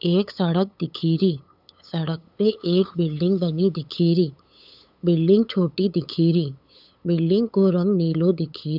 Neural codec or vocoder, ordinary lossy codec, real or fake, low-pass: codec, 16 kHz, 8 kbps, FunCodec, trained on LibriTTS, 25 frames a second; none; fake; 5.4 kHz